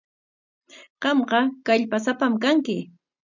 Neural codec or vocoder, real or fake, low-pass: none; real; 7.2 kHz